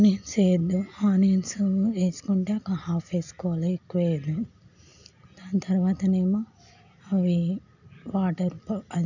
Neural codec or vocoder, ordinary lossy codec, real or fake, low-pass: none; none; real; 7.2 kHz